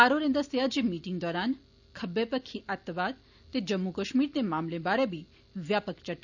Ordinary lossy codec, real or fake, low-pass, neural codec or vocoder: none; real; 7.2 kHz; none